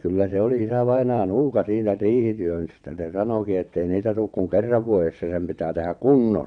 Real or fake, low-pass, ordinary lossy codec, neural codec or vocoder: fake; 9.9 kHz; none; vocoder, 22.05 kHz, 80 mel bands, WaveNeXt